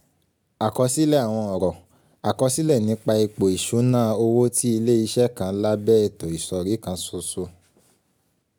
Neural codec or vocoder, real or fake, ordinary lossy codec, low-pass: none; real; none; none